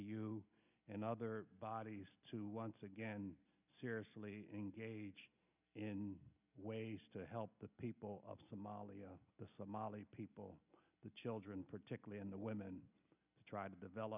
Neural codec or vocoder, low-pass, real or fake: none; 3.6 kHz; real